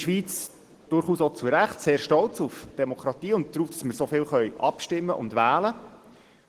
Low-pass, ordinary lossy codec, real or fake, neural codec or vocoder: 14.4 kHz; Opus, 16 kbps; real; none